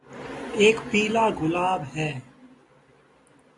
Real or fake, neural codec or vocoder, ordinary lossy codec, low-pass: real; none; AAC, 48 kbps; 10.8 kHz